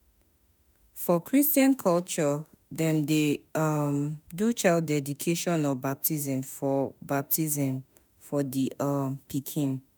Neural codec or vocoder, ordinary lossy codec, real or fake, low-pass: autoencoder, 48 kHz, 32 numbers a frame, DAC-VAE, trained on Japanese speech; none; fake; none